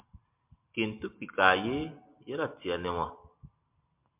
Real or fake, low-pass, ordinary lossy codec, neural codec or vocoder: real; 3.6 kHz; MP3, 32 kbps; none